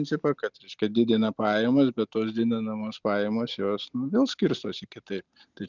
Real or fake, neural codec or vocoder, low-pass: real; none; 7.2 kHz